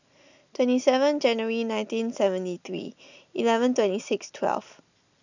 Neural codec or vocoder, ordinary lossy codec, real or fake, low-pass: none; none; real; 7.2 kHz